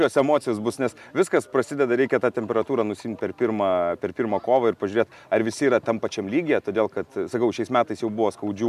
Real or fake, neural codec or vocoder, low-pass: real; none; 14.4 kHz